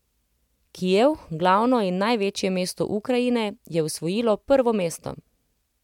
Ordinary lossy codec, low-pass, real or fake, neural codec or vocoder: MP3, 96 kbps; 19.8 kHz; real; none